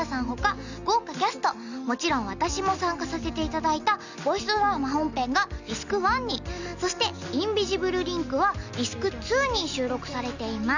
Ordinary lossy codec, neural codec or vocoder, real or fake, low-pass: none; none; real; 7.2 kHz